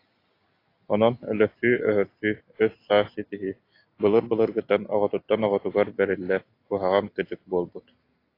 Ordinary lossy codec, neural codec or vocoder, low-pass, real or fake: AAC, 32 kbps; none; 5.4 kHz; real